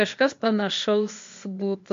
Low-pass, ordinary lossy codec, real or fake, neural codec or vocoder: 7.2 kHz; MP3, 48 kbps; fake; codec, 16 kHz, 0.8 kbps, ZipCodec